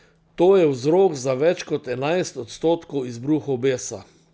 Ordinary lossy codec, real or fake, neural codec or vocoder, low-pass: none; real; none; none